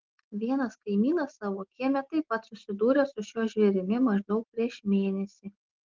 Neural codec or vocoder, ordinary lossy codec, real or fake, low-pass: none; Opus, 16 kbps; real; 7.2 kHz